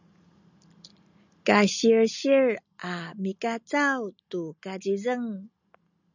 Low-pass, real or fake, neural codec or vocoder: 7.2 kHz; real; none